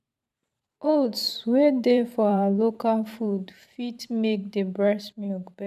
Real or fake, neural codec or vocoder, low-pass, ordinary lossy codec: fake; vocoder, 44.1 kHz, 128 mel bands every 512 samples, BigVGAN v2; 14.4 kHz; none